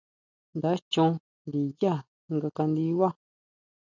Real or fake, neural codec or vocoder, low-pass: real; none; 7.2 kHz